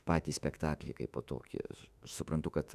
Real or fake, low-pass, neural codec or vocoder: fake; 14.4 kHz; autoencoder, 48 kHz, 32 numbers a frame, DAC-VAE, trained on Japanese speech